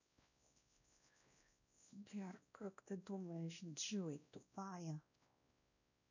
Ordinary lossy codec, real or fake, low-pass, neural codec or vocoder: none; fake; 7.2 kHz; codec, 16 kHz, 1 kbps, X-Codec, WavLM features, trained on Multilingual LibriSpeech